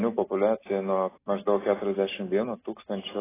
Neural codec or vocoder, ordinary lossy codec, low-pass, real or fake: none; AAC, 16 kbps; 3.6 kHz; real